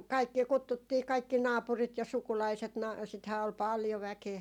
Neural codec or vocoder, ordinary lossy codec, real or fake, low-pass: none; none; real; 19.8 kHz